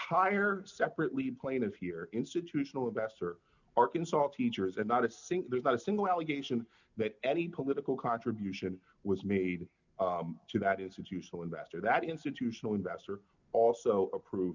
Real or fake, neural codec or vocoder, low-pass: real; none; 7.2 kHz